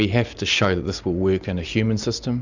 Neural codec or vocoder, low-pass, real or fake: none; 7.2 kHz; real